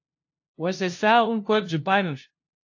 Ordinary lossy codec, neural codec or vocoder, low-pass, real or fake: AAC, 48 kbps; codec, 16 kHz, 0.5 kbps, FunCodec, trained on LibriTTS, 25 frames a second; 7.2 kHz; fake